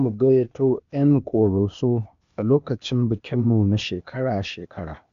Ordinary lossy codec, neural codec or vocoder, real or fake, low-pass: MP3, 64 kbps; codec, 16 kHz, 0.8 kbps, ZipCodec; fake; 7.2 kHz